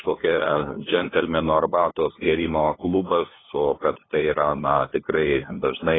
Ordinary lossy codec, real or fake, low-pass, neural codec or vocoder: AAC, 16 kbps; fake; 7.2 kHz; codec, 16 kHz, 2 kbps, FunCodec, trained on LibriTTS, 25 frames a second